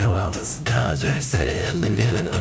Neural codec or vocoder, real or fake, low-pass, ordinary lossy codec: codec, 16 kHz, 0.5 kbps, FunCodec, trained on LibriTTS, 25 frames a second; fake; none; none